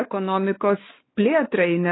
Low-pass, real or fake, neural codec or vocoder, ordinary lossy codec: 7.2 kHz; fake; codec, 16 kHz, 4 kbps, X-Codec, WavLM features, trained on Multilingual LibriSpeech; AAC, 16 kbps